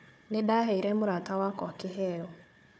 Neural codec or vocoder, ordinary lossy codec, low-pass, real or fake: codec, 16 kHz, 4 kbps, FunCodec, trained on Chinese and English, 50 frames a second; none; none; fake